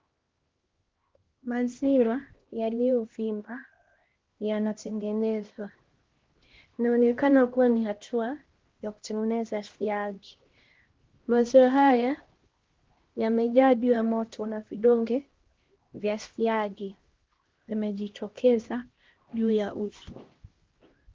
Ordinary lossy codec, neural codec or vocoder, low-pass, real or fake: Opus, 16 kbps; codec, 16 kHz, 1 kbps, X-Codec, HuBERT features, trained on LibriSpeech; 7.2 kHz; fake